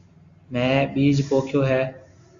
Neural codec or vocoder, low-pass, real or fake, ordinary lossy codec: none; 7.2 kHz; real; Opus, 64 kbps